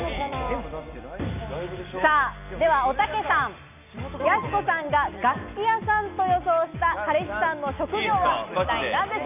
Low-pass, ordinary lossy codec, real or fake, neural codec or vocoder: 3.6 kHz; none; real; none